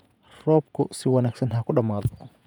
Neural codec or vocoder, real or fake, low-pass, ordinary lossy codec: vocoder, 48 kHz, 128 mel bands, Vocos; fake; 19.8 kHz; none